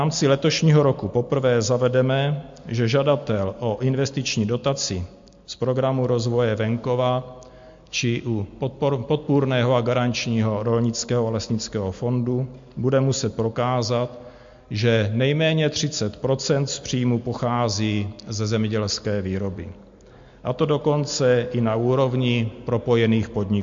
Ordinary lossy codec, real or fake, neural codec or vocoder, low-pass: MP3, 48 kbps; real; none; 7.2 kHz